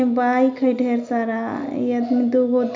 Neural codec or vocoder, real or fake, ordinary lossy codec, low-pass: none; real; MP3, 64 kbps; 7.2 kHz